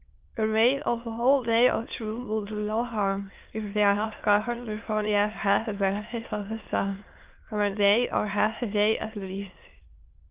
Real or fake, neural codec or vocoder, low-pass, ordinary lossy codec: fake; autoencoder, 22.05 kHz, a latent of 192 numbers a frame, VITS, trained on many speakers; 3.6 kHz; Opus, 32 kbps